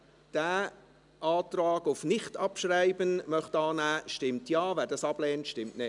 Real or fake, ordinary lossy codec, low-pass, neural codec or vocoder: real; none; 10.8 kHz; none